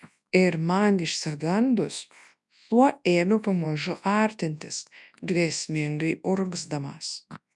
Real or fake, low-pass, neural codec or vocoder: fake; 10.8 kHz; codec, 24 kHz, 0.9 kbps, WavTokenizer, large speech release